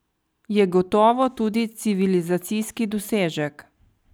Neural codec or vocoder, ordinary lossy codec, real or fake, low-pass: none; none; real; none